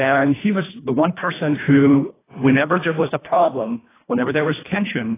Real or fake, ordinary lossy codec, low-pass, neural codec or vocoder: fake; AAC, 16 kbps; 3.6 kHz; codec, 24 kHz, 1.5 kbps, HILCodec